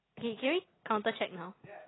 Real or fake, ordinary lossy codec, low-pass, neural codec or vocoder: real; AAC, 16 kbps; 7.2 kHz; none